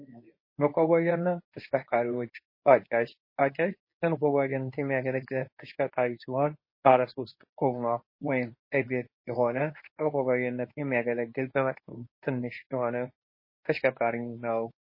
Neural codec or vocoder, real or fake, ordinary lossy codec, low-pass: codec, 24 kHz, 0.9 kbps, WavTokenizer, medium speech release version 2; fake; MP3, 24 kbps; 5.4 kHz